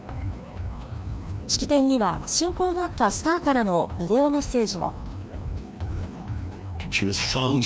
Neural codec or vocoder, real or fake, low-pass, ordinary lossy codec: codec, 16 kHz, 1 kbps, FreqCodec, larger model; fake; none; none